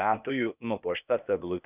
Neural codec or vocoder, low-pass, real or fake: codec, 16 kHz, 0.8 kbps, ZipCodec; 3.6 kHz; fake